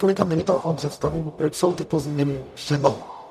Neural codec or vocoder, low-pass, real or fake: codec, 44.1 kHz, 0.9 kbps, DAC; 14.4 kHz; fake